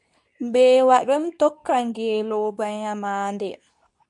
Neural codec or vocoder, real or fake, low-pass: codec, 24 kHz, 0.9 kbps, WavTokenizer, medium speech release version 2; fake; 10.8 kHz